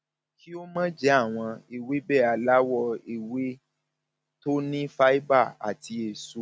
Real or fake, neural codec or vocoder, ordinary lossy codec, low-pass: real; none; none; none